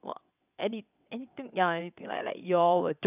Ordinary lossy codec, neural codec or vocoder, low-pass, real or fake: none; vocoder, 22.05 kHz, 80 mel bands, WaveNeXt; 3.6 kHz; fake